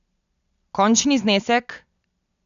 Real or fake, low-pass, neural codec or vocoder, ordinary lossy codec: real; 7.2 kHz; none; none